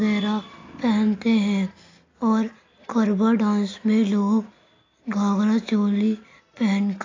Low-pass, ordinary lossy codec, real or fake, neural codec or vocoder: 7.2 kHz; AAC, 32 kbps; real; none